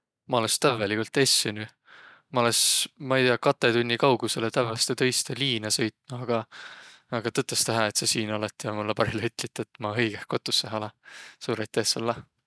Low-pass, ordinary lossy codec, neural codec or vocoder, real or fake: none; none; none; real